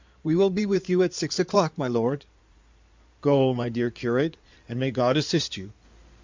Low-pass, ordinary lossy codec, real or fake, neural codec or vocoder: 7.2 kHz; MP3, 64 kbps; fake; codec, 16 kHz in and 24 kHz out, 2.2 kbps, FireRedTTS-2 codec